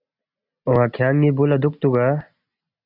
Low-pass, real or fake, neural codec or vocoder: 5.4 kHz; real; none